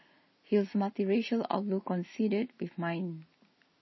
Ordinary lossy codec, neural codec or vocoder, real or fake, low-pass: MP3, 24 kbps; none; real; 7.2 kHz